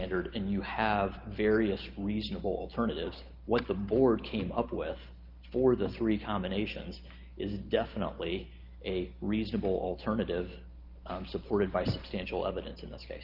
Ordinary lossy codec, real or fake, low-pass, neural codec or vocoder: Opus, 24 kbps; real; 5.4 kHz; none